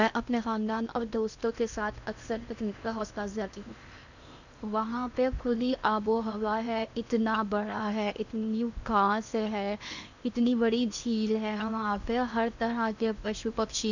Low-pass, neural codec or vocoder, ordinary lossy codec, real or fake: 7.2 kHz; codec, 16 kHz in and 24 kHz out, 0.8 kbps, FocalCodec, streaming, 65536 codes; none; fake